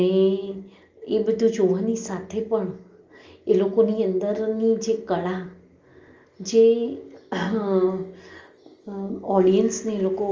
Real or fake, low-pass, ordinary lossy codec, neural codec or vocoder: real; 7.2 kHz; Opus, 32 kbps; none